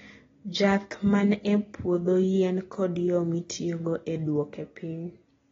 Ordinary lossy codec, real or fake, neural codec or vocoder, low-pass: AAC, 24 kbps; real; none; 7.2 kHz